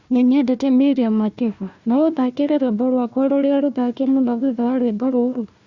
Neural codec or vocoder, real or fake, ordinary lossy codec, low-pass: codec, 16 kHz, 1 kbps, FunCodec, trained on Chinese and English, 50 frames a second; fake; Opus, 64 kbps; 7.2 kHz